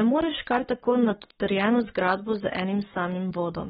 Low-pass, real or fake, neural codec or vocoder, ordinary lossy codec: 19.8 kHz; fake; codec, 44.1 kHz, 7.8 kbps, Pupu-Codec; AAC, 16 kbps